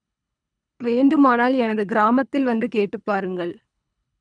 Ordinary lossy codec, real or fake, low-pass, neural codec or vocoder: none; fake; 9.9 kHz; codec, 24 kHz, 3 kbps, HILCodec